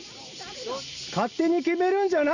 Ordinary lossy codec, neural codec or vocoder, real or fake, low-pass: none; none; real; 7.2 kHz